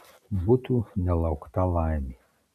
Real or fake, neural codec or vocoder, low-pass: real; none; 14.4 kHz